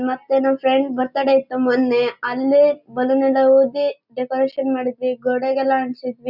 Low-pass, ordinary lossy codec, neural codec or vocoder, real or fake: 5.4 kHz; none; none; real